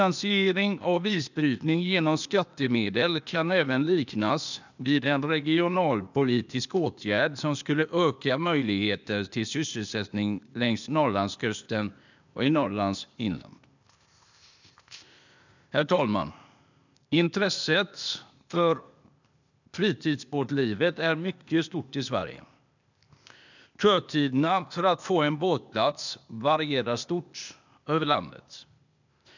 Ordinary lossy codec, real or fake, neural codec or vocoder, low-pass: none; fake; codec, 16 kHz, 0.8 kbps, ZipCodec; 7.2 kHz